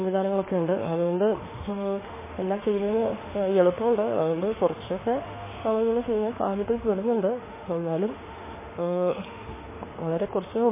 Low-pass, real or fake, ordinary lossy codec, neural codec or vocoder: 3.6 kHz; fake; MP3, 16 kbps; autoencoder, 48 kHz, 32 numbers a frame, DAC-VAE, trained on Japanese speech